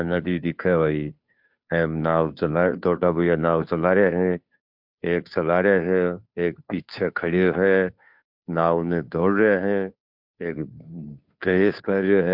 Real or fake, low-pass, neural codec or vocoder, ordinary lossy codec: fake; 5.4 kHz; codec, 16 kHz, 2 kbps, FunCodec, trained on Chinese and English, 25 frames a second; MP3, 48 kbps